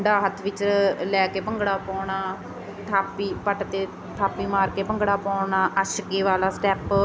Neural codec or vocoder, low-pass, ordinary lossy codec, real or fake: none; none; none; real